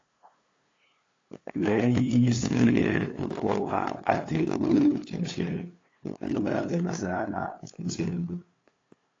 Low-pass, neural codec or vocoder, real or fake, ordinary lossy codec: 7.2 kHz; codec, 16 kHz, 2 kbps, FunCodec, trained on LibriTTS, 25 frames a second; fake; AAC, 32 kbps